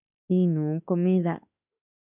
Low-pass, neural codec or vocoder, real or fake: 3.6 kHz; autoencoder, 48 kHz, 32 numbers a frame, DAC-VAE, trained on Japanese speech; fake